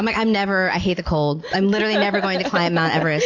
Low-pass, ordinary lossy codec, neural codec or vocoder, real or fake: 7.2 kHz; AAC, 48 kbps; none; real